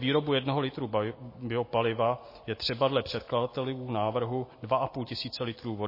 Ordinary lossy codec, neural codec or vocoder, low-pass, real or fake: MP3, 24 kbps; none; 5.4 kHz; real